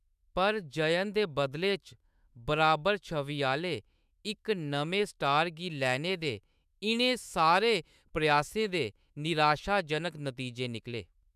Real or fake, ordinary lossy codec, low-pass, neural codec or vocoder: fake; none; 14.4 kHz; autoencoder, 48 kHz, 128 numbers a frame, DAC-VAE, trained on Japanese speech